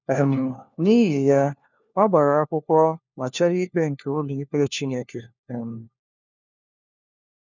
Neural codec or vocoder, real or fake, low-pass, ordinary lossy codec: codec, 16 kHz, 1 kbps, FunCodec, trained on LibriTTS, 50 frames a second; fake; 7.2 kHz; none